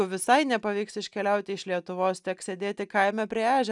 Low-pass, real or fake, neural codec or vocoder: 10.8 kHz; real; none